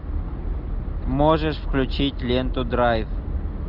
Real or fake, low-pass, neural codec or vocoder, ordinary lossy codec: real; 5.4 kHz; none; Opus, 64 kbps